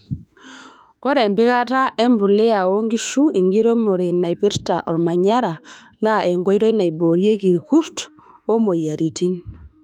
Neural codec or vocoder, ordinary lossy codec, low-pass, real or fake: autoencoder, 48 kHz, 32 numbers a frame, DAC-VAE, trained on Japanese speech; none; 19.8 kHz; fake